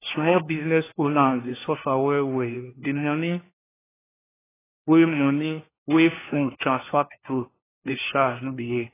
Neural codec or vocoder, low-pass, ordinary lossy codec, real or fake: codec, 16 kHz, 1 kbps, FunCodec, trained on LibriTTS, 50 frames a second; 3.6 kHz; AAC, 16 kbps; fake